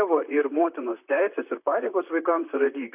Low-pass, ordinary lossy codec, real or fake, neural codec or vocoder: 3.6 kHz; AAC, 32 kbps; fake; vocoder, 44.1 kHz, 128 mel bands, Pupu-Vocoder